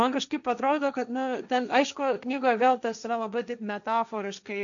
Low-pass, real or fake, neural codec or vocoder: 7.2 kHz; fake; codec, 16 kHz, 1.1 kbps, Voila-Tokenizer